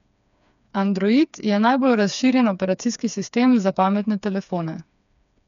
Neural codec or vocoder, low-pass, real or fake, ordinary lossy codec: codec, 16 kHz, 4 kbps, FreqCodec, smaller model; 7.2 kHz; fake; none